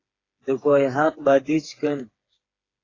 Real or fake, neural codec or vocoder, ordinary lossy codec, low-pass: fake; codec, 16 kHz, 4 kbps, FreqCodec, smaller model; AAC, 32 kbps; 7.2 kHz